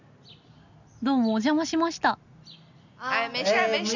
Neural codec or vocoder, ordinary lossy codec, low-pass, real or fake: none; none; 7.2 kHz; real